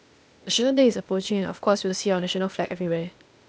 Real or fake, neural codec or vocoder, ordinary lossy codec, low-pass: fake; codec, 16 kHz, 0.8 kbps, ZipCodec; none; none